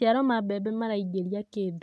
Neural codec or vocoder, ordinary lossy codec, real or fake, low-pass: none; none; real; none